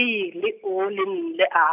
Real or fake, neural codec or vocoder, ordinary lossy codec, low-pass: real; none; none; 3.6 kHz